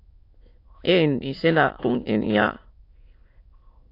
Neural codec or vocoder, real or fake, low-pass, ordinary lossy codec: autoencoder, 22.05 kHz, a latent of 192 numbers a frame, VITS, trained on many speakers; fake; 5.4 kHz; AAC, 32 kbps